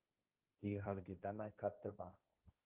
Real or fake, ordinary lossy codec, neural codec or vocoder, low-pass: fake; Opus, 24 kbps; codec, 16 kHz, 1.1 kbps, Voila-Tokenizer; 3.6 kHz